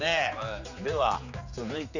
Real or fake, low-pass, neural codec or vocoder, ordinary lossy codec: fake; 7.2 kHz; codec, 16 kHz, 4 kbps, X-Codec, HuBERT features, trained on general audio; none